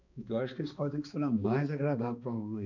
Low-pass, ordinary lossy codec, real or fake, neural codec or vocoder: 7.2 kHz; none; fake; codec, 16 kHz, 2 kbps, X-Codec, HuBERT features, trained on balanced general audio